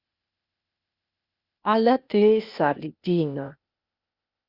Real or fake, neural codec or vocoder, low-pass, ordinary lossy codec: fake; codec, 16 kHz, 0.8 kbps, ZipCodec; 5.4 kHz; Opus, 64 kbps